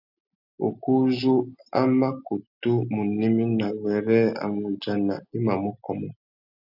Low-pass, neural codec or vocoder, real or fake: 5.4 kHz; none; real